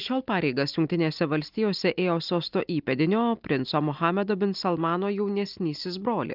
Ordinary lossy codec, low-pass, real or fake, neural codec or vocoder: Opus, 24 kbps; 5.4 kHz; real; none